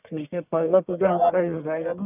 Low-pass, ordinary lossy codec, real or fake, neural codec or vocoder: 3.6 kHz; none; fake; codec, 44.1 kHz, 1.7 kbps, Pupu-Codec